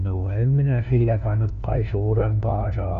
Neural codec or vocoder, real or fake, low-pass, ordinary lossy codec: codec, 16 kHz, 1 kbps, FunCodec, trained on LibriTTS, 50 frames a second; fake; 7.2 kHz; none